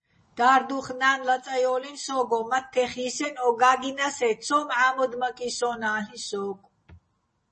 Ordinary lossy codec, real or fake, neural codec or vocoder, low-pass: MP3, 32 kbps; real; none; 10.8 kHz